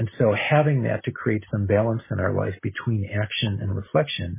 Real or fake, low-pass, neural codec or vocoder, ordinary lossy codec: real; 3.6 kHz; none; MP3, 16 kbps